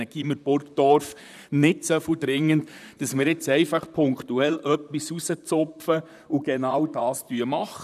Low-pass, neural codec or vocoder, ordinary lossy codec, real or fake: 14.4 kHz; vocoder, 44.1 kHz, 128 mel bands, Pupu-Vocoder; none; fake